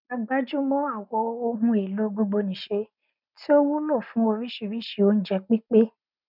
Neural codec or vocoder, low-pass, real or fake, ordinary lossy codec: none; 5.4 kHz; real; none